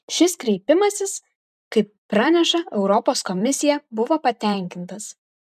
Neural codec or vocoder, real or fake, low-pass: vocoder, 44.1 kHz, 128 mel bands every 512 samples, BigVGAN v2; fake; 14.4 kHz